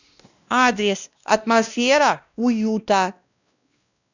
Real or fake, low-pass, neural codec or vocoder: fake; 7.2 kHz; codec, 16 kHz, 1 kbps, X-Codec, WavLM features, trained on Multilingual LibriSpeech